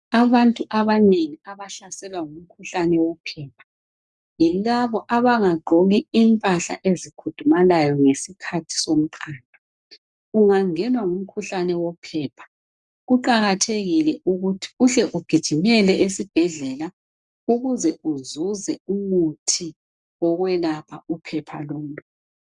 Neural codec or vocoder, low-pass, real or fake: codec, 44.1 kHz, 7.8 kbps, Pupu-Codec; 10.8 kHz; fake